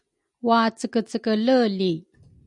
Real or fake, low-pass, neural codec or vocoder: real; 10.8 kHz; none